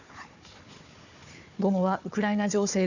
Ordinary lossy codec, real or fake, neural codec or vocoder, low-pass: none; fake; codec, 16 kHz, 4 kbps, FunCodec, trained on Chinese and English, 50 frames a second; 7.2 kHz